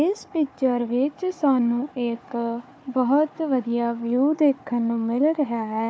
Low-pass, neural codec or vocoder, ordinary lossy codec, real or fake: none; codec, 16 kHz, 4 kbps, FunCodec, trained on Chinese and English, 50 frames a second; none; fake